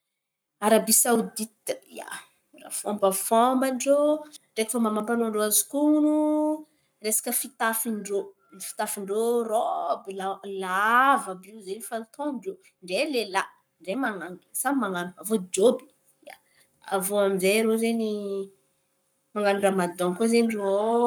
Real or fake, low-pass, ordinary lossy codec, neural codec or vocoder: fake; none; none; codec, 44.1 kHz, 7.8 kbps, Pupu-Codec